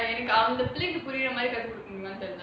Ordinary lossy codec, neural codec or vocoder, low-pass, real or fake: none; none; none; real